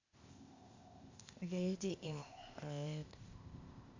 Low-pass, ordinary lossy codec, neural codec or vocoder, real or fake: 7.2 kHz; Opus, 64 kbps; codec, 16 kHz, 0.8 kbps, ZipCodec; fake